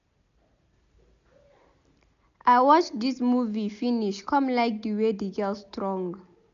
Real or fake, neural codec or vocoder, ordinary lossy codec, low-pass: real; none; none; 7.2 kHz